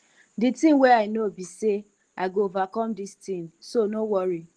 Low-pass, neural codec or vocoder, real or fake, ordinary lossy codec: 9.9 kHz; none; real; Opus, 16 kbps